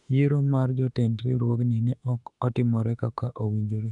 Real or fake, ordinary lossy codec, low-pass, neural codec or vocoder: fake; none; 10.8 kHz; autoencoder, 48 kHz, 32 numbers a frame, DAC-VAE, trained on Japanese speech